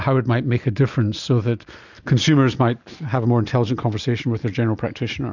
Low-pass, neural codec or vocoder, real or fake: 7.2 kHz; none; real